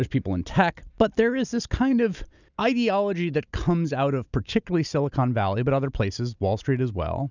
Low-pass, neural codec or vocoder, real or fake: 7.2 kHz; none; real